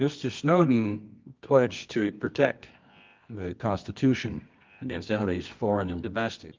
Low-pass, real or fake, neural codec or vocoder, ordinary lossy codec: 7.2 kHz; fake; codec, 24 kHz, 0.9 kbps, WavTokenizer, medium music audio release; Opus, 24 kbps